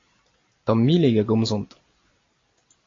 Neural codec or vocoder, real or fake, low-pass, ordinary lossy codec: none; real; 7.2 kHz; AAC, 32 kbps